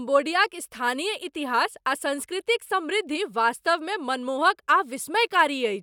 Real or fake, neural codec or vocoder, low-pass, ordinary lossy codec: real; none; 19.8 kHz; none